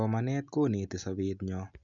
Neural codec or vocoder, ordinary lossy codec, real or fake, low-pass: none; none; real; 7.2 kHz